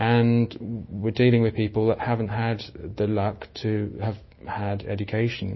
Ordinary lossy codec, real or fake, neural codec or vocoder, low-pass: MP3, 24 kbps; fake; autoencoder, 48 kHz, 128 numbers a frame, DAC-VAE, trained on Japanese speech; 7.2 kHz